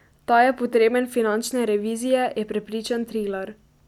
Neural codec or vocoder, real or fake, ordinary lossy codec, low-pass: none; real; none; 19.8 kHz